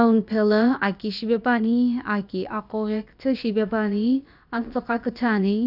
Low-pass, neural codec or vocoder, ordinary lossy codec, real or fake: 5.4 kHz; codec, 16 kHz, about 1 kbps, DyCAST, with the encoder's durations; none; fake